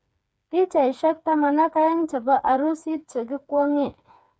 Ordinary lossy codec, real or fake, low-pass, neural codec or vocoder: none; fake; none; codec, 16 kHz, 4 kbps, FreqCodec, smaller model